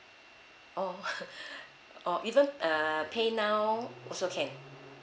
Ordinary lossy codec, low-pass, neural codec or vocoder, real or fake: none; none; none; real